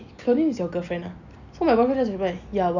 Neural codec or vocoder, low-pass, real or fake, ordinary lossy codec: none; 7.2 kHz; real; none